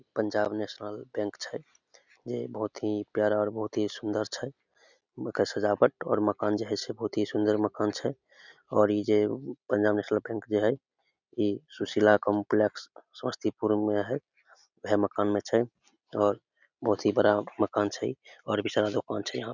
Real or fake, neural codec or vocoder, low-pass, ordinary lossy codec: real; none; 7.2 kHz; none